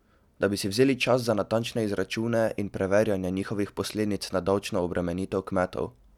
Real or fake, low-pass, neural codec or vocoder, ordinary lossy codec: real; 19.8 kHz; none; none